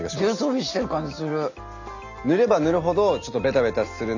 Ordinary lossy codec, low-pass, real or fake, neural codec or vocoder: none; 7.2 kHz; real; none